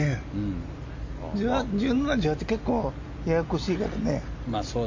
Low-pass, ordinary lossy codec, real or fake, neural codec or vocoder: 7.2 kHz; MP3, 48 kbps; real; none